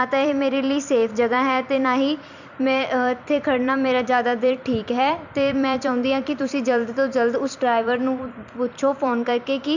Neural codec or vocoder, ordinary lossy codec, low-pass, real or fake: vocoder, 44.1 kHz, 128 mel bands every 256 samples, BigVGAN v2; none; 7.2 kHz; fake